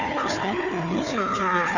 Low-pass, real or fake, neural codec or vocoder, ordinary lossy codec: 7.2 kHz; fake; codec, 16 kHz, 4 kbps, FunCodec, trained on Chinese and English, 50 frames a second; none